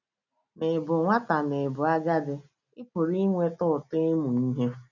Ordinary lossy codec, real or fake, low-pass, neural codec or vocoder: none; real; 7.2 kHz; none